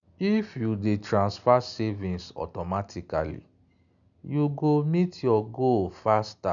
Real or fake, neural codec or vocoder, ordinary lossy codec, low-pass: real; none; none; 7.2 kHz